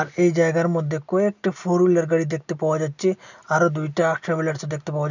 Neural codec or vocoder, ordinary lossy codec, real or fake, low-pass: none; none; real; 7.2 kHz